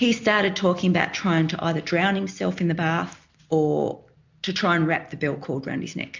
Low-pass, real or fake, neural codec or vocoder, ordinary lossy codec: 7.2 kHz; real; none; MP3, 64 kbps